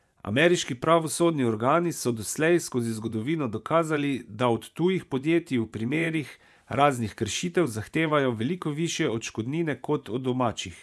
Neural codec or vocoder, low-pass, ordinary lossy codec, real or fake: vocoder, 24 kHz, 100 mel bands, Vocos; none; none; fake